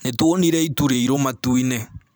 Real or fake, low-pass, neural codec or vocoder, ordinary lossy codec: fake; none; vocoder, 44.1 kHz, 128 mel bands every 256 samples, BigVGAN v2; none